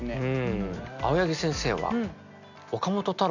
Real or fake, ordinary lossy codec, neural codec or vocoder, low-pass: real; none; none; 7.2 kHz